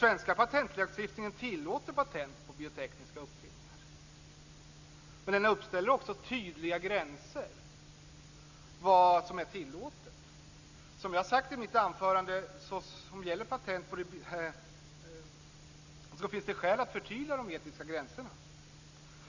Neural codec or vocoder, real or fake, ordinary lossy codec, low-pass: none; real; Opus, 64 kbps; 7.2 kHz